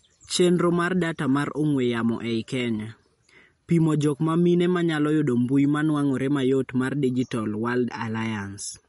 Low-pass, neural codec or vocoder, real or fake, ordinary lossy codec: 19.8 kHz; none; real; MP3, 48 kbps